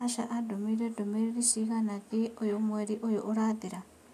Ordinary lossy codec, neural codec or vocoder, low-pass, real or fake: none; none; 14.4 kHz; real